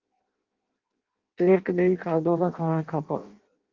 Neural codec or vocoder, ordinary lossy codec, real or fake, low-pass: codec, 16 kHz in and 24 kHz out, 0.6 kbps, FireRedTTS-2 codec; Opus, 24 kbps; fake; 7.2 kHz